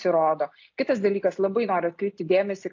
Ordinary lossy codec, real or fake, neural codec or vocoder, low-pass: AAC, 48 kbps; real; none; 7.2 kHz